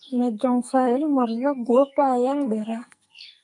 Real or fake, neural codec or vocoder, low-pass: fake; codec, 44.1 kHz, 2.6 kbps, SNAC; 10.8 kHz